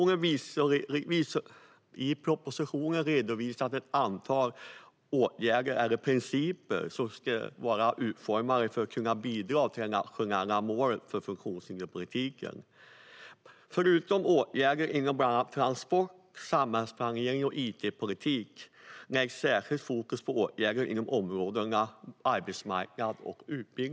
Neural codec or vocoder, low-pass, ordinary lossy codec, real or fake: none; none; none; real